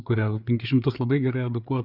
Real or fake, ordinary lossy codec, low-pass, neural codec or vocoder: fake; Opus, 64 kbps; 5.4 kHz; codec, 16 kHz, 4 kbps, FreqCodec, larger model